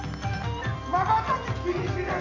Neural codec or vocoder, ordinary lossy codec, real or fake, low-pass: vocoder, 44.1 kHz, 128 mel bands every 256 samples, BigVGAN v2; AAC, 32 kbps; fake; 7.2 kHz